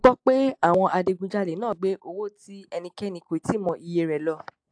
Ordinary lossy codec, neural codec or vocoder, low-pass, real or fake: AAC, 64 kbps; none; 9.9 kHz; real